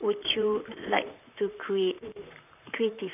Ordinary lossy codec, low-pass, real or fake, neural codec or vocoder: none; 3.6 kHz; fake; vocoder, 44.1 kHz, 128 mel bands, Pupu-Vocoder